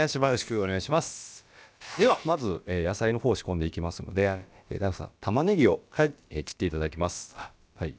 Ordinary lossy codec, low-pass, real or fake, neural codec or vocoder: none; none; fake; codec, 16 kHz, about 1 kbps, DyCAST, with the encoder's durations